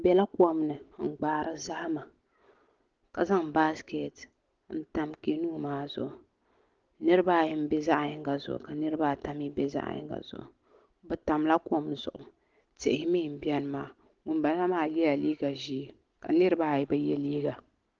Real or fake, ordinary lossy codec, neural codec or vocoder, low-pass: real; Opus, 16 kbps; none; 7.2 kHz